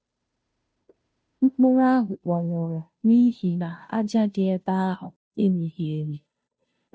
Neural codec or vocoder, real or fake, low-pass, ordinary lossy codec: codec, 16 kHz, 0.5 kbps, FunCodec, trained on Chinese and English, 25 frames a second; fake; none; none